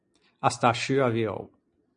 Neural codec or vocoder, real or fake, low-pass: none; real; 9.9 kHz